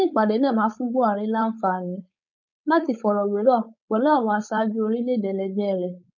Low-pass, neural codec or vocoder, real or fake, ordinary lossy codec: 7.2 kHz; codec, 16 kHz, 4.8 kbps, FACodec; fake; none